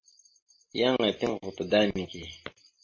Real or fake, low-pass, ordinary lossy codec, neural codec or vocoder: real; 7.2 kHz; MP3, 32 kbps; none